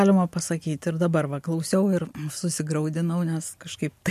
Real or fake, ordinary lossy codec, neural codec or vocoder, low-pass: real; MP3, 64 kbps; none; 14.4 kHz